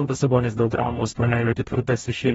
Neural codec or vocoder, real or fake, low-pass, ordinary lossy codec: codec, 44.1 kHz, 0.9 kbps, DAC; fake; 19.8 kHz; AAC, 24 kbps